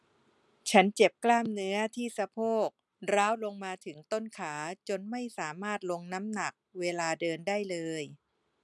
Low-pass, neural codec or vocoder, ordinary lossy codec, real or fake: none; none; none; real